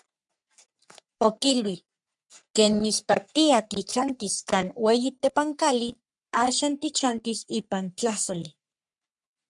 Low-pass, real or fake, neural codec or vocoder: 10.8 kHz; fake; codec, 44.1 kHz, 3.4 kbps, Pupu-Codec